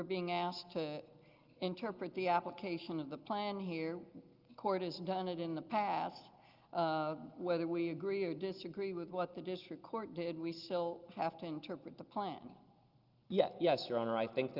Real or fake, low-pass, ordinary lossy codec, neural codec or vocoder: real; 5.4 kHz; Opus, 32 kbps; none